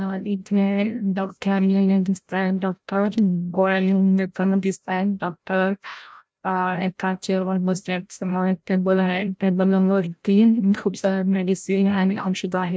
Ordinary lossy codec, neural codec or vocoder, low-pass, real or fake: none; codec, 16 kHz, 0.5 kbps, FreqCodec, larger model; none; fake